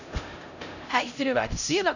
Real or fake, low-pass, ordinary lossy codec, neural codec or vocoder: fake; 7.2 kHz; none; codec, 16 kHz, 0.5 kbps, X-Codec, HuBERT features, trained on LibriSpeech